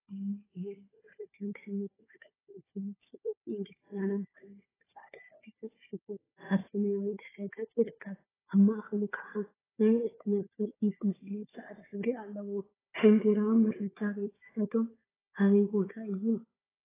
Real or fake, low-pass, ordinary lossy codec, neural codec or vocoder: fake; 3.6 kHz; AAC, 16 kbps; codec, 16 kHz, 4 kbps, FunCodec, trained on Chinese and English, 50 frames a second